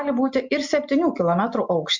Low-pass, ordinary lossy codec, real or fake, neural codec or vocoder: 7.2 kHz; MP3, 64 kbps; real; none